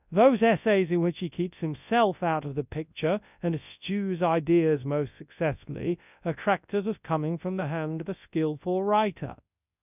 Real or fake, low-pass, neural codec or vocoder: fake; 3.6 kHz; codec, 24 kHz, 0.9 kbps, WavTokenizer, large speech release